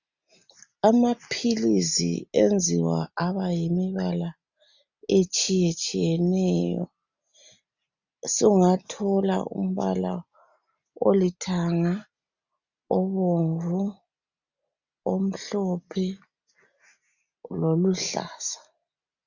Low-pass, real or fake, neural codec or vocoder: 7.2 kHz; real; none